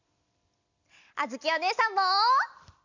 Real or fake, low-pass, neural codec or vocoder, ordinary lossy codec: real; 7.2 kHz; none; none